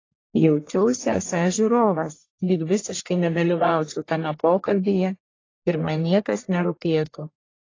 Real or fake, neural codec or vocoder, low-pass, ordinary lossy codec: fake; codec, 44.1 kHz, 1.7 kbps, Pupu-Codec; 7.2 kHz; AAC, 32 kbps